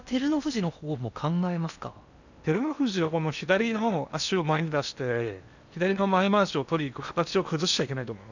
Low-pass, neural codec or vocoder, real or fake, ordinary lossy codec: 7.2 kHz; codec, 16 kHz in and 24 kHz out, 0.8 kbps, FocalCodec, streaming, 65536 codes; fake; none